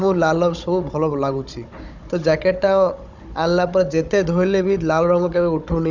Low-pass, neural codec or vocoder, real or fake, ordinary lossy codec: 7.2 kHz; codec, 16 kHz, 16 kbps, FunCodec, trained on Chinese and English, 50 frames a second; fake; none